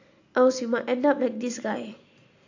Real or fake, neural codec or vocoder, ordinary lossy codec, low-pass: real; none; AAC, 48 kbps; 7.2 kHz